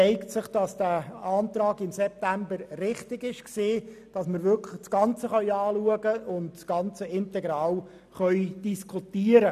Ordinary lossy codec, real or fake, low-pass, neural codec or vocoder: none; real; 14.4 kHz; none